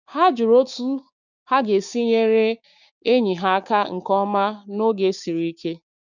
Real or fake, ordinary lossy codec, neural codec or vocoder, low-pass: fake; none; codec, 16 kHz, 6 kbps, DAC; 7.2 kHz